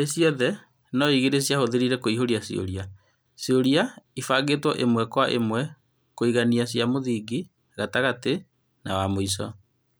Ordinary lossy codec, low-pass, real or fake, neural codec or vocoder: none; none; real; none